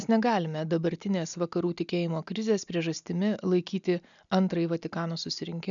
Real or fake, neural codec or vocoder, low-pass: real; none; 7.2 kHz